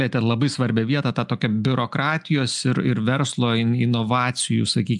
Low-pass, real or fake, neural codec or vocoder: 10.8 kHz; real; none